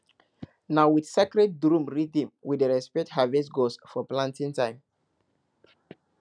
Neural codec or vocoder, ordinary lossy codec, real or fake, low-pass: none; none; real; 9.9 kHz